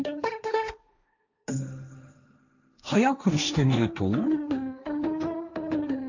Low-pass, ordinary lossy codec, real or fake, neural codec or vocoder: 7.2 kHz; none; fake; codec, 16 kHz, 1.1 kbps, Voila-Tokenizer